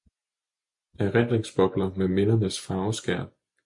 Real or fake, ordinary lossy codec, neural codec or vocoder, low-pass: real; MP3, 64 kbps; none; 10.8 kHz